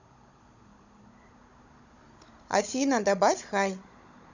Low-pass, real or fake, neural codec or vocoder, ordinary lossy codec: 7.2 kHz; fake; codec, 16 kHz, 16 kbps, FunCodec, trained on Chinese and English, 50 frames a second; none